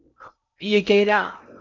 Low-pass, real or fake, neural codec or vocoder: 7.2 kHz; fake; codec, 16 kHz in and 24 kHz out, 0.6 kbps, FocalCodec, streaming, 2048 codes